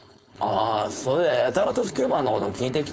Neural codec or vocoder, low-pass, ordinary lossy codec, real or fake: codec, 16 kHz, 4.8 kbps, FACodec; none; none; fake